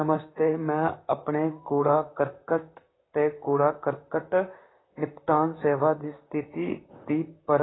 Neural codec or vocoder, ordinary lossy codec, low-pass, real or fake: vocoder, 44.1 kHz, 128 mel bands, Pupu-Vocoder; AAC, 16 kbps; 7.2 kHz; fake